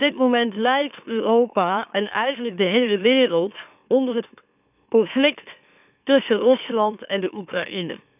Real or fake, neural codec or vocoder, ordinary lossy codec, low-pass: fake; autoencoder, 44.1 kHz, a latent of 192 numbers a frame, MeloTTS; none; 3.6 kHz